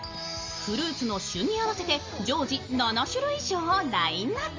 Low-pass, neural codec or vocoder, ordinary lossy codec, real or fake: 7.2 kHz; none; Opus, 32 kbps; real